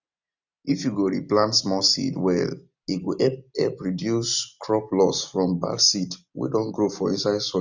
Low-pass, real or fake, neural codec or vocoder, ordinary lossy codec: 7.2 kHz; real; none; none